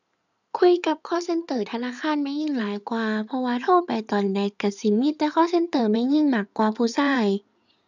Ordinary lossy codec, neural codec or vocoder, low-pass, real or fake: none; codec, 16 kHz in and 24 kHz out, 2.2 kbps, FireRedTTS-2 codec; 7.2 kHz; fake